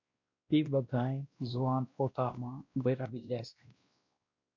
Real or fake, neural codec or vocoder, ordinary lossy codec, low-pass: fake; codec, 16 kHz, 1 kbps, X-Codec, WavLM features, trained on Multilingual LibriSpeech; MP3, 48 kbps; 7.2 kHz